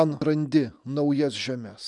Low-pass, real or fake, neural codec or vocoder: 10.8 kHz; real; none